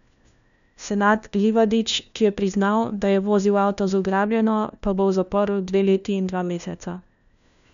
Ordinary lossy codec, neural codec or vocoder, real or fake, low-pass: none; codec, 16 kHz, 1 kbps, FunCodec, trained on LibriTTS, 50 frames a second; fake; 7.2 kHz